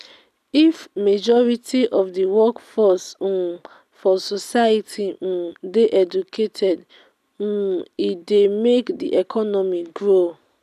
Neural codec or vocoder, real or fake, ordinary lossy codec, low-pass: none; real; none; 14.4 kHz